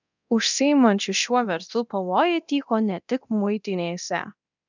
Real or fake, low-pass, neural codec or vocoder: fake; 7.2 kHz; codec, 24 kHz, 0.9 kbps, DualCodec